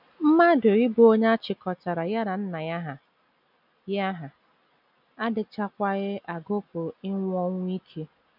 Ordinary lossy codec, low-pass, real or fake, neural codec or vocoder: none; 5.4 kHz; real; none